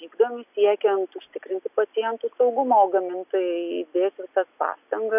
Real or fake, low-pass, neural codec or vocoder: real; 3.6 kHz; none